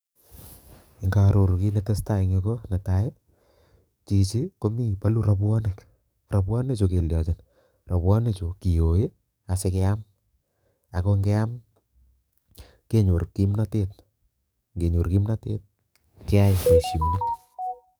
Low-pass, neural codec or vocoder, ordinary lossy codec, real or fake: none; codec, 44.1 kHz, 7.8 kbps, DAC; none; fake